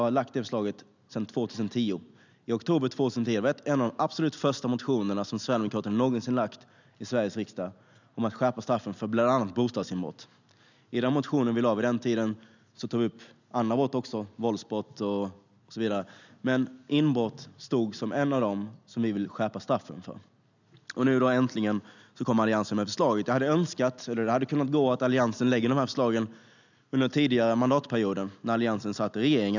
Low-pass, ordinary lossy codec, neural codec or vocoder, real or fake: 7.2 kHz; none; none; real